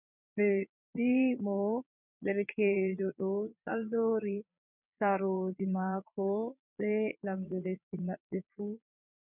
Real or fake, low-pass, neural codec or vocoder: fake; 3.6 kHz; vocoder, 22.05 kHz, 80 mel bands, Vocos